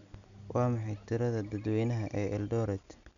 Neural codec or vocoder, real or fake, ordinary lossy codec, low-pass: none; real; none; 7.2 kHz